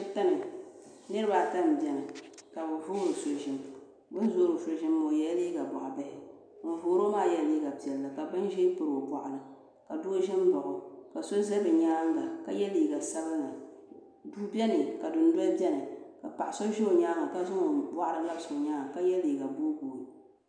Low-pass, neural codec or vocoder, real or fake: 9.9 kHz; none; real